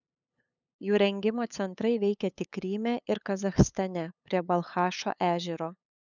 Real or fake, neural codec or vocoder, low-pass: fake; codec, 16 kHz, 8 kbps, FunCodec, trained on LibriTTS, 25 frames a second; 7.2 kHz